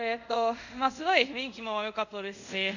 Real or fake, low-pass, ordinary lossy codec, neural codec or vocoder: fake; 7.2 kHz; none; codec, 24 kHz, 0.5 kbps, DualCodec